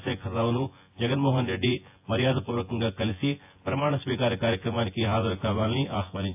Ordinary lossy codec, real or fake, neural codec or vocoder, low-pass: AAC, 32 kbps; fake; vocoder, 24 kHz, 100 mel bands, Vocos; 3.6 kHz